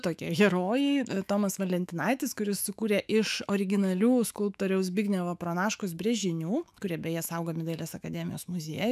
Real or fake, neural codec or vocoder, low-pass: fake; autoencoder, 48 kHz, 128 numbers a frame, DAC-VAE, trained on Japanese speech; 14.4 kHz